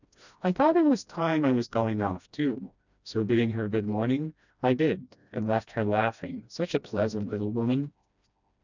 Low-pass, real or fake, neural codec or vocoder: 7.2 kHz; fake; codec, 16 kHz, 1 kbps, FreqCodec, smaller model